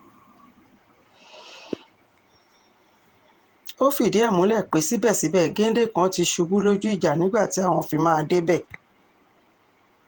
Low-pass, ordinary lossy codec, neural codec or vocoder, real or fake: 19.8 kHz; Opus, 24 kbps; vocoder, 48 kHz, 128 mel bands, Vocos; fake